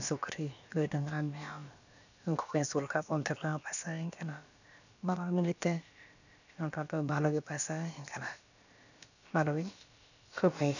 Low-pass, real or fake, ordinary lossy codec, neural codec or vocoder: 7.2 kHz; fake; none; codec, 16 kHz, about 1 kbps, DyCAST, with the encoder's durations